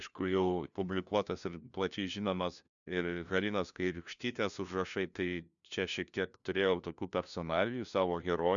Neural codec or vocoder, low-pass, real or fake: codec, 16 kHz, 1 kbps, FunCodec, trained on LibriTTS, 50 frames a second; 7.2 kHz; fake